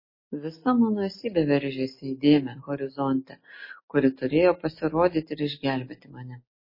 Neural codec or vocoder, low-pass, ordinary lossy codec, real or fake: none; 5.4 kHz; MP3, 24 kbps; real